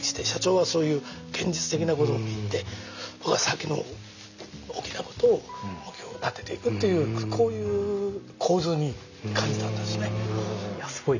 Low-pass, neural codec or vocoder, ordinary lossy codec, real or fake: 7.2 kHz; none; none; real